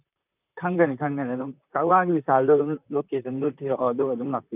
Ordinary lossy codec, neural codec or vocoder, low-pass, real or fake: none; vocoder, 44.1 kHz, 128 mel bands, Pupu-Vocoder; 3.6 kHz; fake